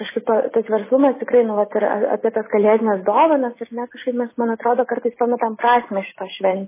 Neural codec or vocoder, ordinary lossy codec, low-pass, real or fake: none; MP3, 16 kbps; 3.6 kHz; real